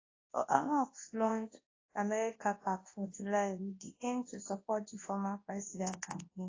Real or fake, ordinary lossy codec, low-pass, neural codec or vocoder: fake; AAC, 32 kbps; 7.2 kHz; codec, 24 kHz, 0.9 kbps, WavTokenizer, large speech release